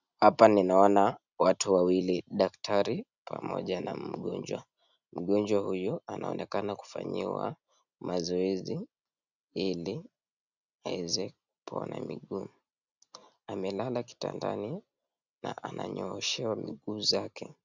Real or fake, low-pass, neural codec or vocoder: real; 7.2 kHz; none